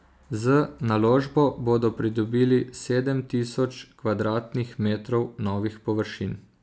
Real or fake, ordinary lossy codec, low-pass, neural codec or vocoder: real; none; none; none